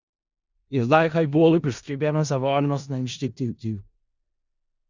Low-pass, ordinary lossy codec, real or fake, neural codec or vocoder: 7.2 kHz; Opus, 64 kbps; fake; codec, 16 kHz in and 24 kHz out, 0.4 kbps, LongCat-Audio-Codec, four codebook decoder